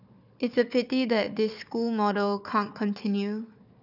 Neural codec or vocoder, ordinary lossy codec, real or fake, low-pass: codec, 16 kHz, 4 kbps, FunCodec, trained on Chinese and English, 50 frames a second; none; fake; 5.4 kHz